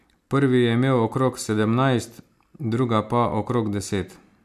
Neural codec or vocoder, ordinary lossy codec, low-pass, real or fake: none; MP3, 96 kbps; 14.4 kHz; real